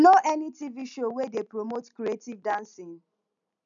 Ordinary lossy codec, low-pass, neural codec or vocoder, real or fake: none; 7.2 kHz; none; real